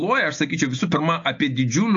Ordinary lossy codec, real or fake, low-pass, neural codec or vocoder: AAC, 48 kbps; real; 7.2 kHz; none